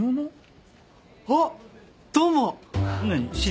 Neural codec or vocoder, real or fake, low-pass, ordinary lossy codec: none; real; none; none